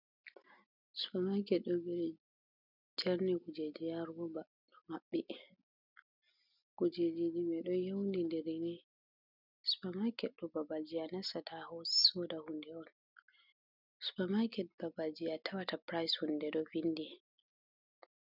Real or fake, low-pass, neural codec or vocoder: real; 5.4 kHz; none